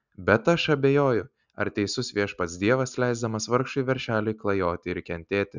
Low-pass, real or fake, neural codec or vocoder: 7.2 kHz; real; none